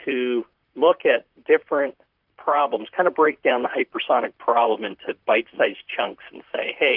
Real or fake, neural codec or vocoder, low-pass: fake; vocoder, 44.1 kHz, 128 mel bands, Pupu-Vocoder; 5.4 kHz